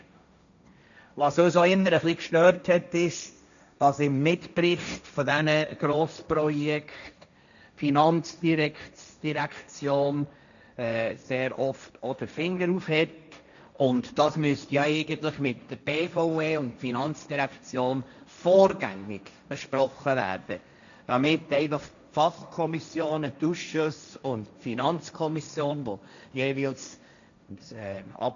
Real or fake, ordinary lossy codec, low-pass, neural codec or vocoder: fake; none; 7.2 kHz; codec, 16 kHz, 1.1 kbps, Voila-Tokenizer